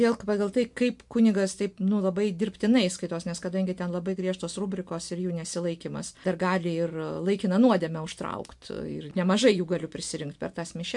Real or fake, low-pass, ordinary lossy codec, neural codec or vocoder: real; 10.8 kHz; MP3, 64 kbps; none